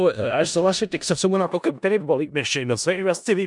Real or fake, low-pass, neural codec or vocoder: fake; 10.8 kHz; codec, 16 kHz in and 24 kHz out, 0.4 kbps, LongCat-Audio-Codec, four codebook decoder